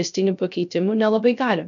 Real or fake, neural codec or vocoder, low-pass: fake; codec, 16 kHz, 0.3 kbps, FocalCodec; 7.2 kHz